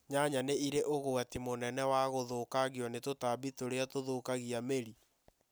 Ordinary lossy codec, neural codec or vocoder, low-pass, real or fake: none; none; none; real